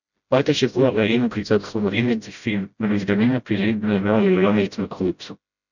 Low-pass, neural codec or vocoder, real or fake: 7.2 kHz; codec, 16 kHz, 0.5 kbps, FreqCodec, smaller model; fake